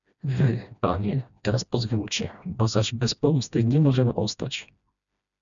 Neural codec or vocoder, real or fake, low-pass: codec, 16 kHz, 1 kbps, FreqCodec, smaller model; fake; 7.2 kHz